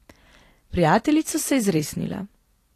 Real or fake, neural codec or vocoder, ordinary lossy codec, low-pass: fake; vocoder, 44.1 kHz, 128 mel bands every 256 samples, BigVGAN v2; AAC, 48 kbps; 14.4 kHz